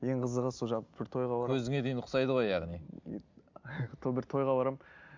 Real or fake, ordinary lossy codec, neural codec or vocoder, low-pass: real; MP3, 64 kbps; none; 7.2 kHz